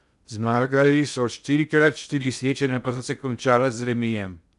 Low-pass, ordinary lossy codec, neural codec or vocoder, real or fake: 10.8 kHz; none; codec, 16 kHz in and 24 kHz out, 0.6 kbps, FocalCodec, streaming, 2048 codes; fake